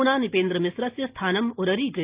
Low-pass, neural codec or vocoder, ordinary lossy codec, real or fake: 3.6 kHz; none; Opus, 32 kbps; real